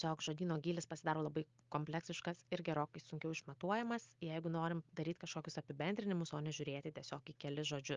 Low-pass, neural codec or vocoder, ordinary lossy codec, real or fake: 7.2 kHz; none; Opus, 32 kbps; real